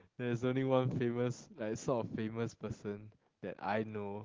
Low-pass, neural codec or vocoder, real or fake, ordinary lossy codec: 7.2 kHz; none; real; Opus, 16 kbps